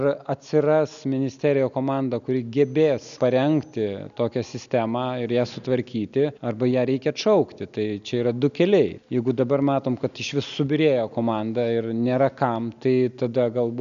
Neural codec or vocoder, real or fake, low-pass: none; real; 7.2 kHz